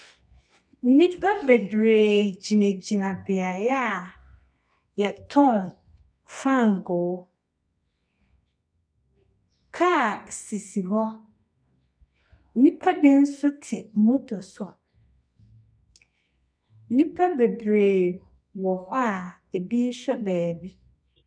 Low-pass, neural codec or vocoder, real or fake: 9.9 kHz; codec, 24 kHz, 0.9 kbps, WavTokenizer, medium music audio release; fake